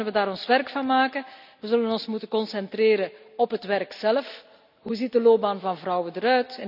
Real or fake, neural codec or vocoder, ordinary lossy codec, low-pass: real; none; none; 5.4 kHz